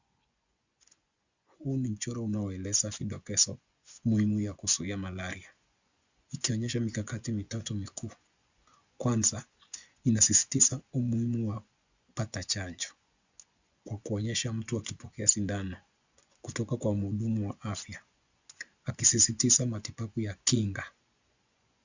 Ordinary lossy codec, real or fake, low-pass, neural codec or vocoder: Opus, 64 kbps; fake; 7.2 kHz; vocoder, 24 kHz, 100 mel bands, Vocos